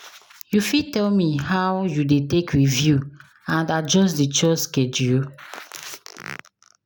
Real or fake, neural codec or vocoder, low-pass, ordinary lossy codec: real; none; none; none